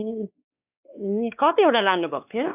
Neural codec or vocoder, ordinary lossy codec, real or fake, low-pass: codec, 16 kHz, 2 kbps, X-Codec, WavLM features, trained on Multilingual LibriSpeech; AAC, 32 kbps; fake; 3.6 kHz